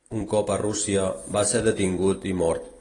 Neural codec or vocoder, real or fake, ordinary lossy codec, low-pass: vocoder, 44.1 kHz, 128 mel bands every 256 samples, BigVGAN v2; fake; AAC, 32 kbps; 10.8 kHz